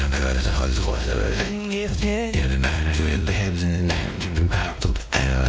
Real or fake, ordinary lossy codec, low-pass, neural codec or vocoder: fake; none; none; codec, 16 kHz, 1 kbps, X-Codec, WavLM features, trained on Multilingual LibriSpeech